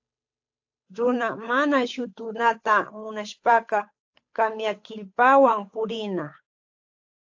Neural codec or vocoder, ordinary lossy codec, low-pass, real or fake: codec, 16 kHz, 8 kbps, FunCodec, trained on Chinese and English, 25 frames a second; AAC, 48 kbps; 7.2 kHz; fake